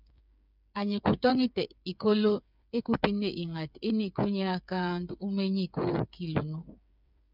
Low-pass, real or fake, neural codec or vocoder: 5.4 kHz; fake; codec, 16 kHz, 8 kbps, FreqCodec, smaller model